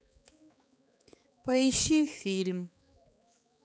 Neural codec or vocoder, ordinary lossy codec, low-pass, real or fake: codec, 16 kHz, 4 kbps, X-Codec, HuBERT features, trained on balanced general audio; none; none; fake